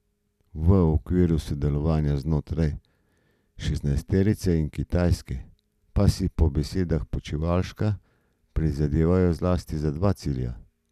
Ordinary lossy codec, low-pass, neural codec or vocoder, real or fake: none; 14.4 kHz; none; real